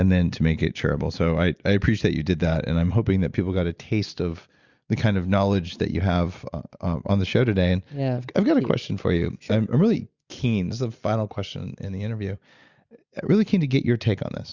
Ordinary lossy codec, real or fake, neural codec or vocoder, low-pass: Opus, 64 kbps; real; none; 7.2 kHz